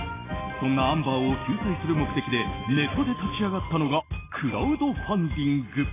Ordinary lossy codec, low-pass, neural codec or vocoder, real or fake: AAC, 16 kbps; 3.6 kHz; none; real